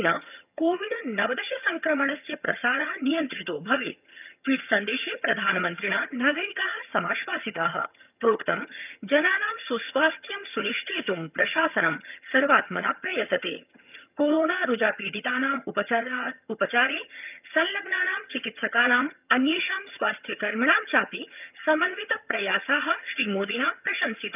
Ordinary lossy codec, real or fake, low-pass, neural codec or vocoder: none; fake; 3.6 kHz; vocoder, 22.05 kHz, 80 mel bands, HiFi-GAN